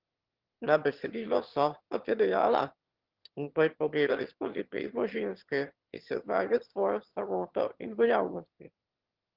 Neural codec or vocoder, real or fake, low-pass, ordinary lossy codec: autoencoder, 22.05 kHz, a latent of 192 numbers a frame, VITS, trained on one speaker; fake; 5.4 kHz; Opus, 16 kbps